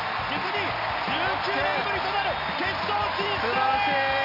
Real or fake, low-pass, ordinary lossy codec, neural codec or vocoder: real; 5.4 kHz; none; none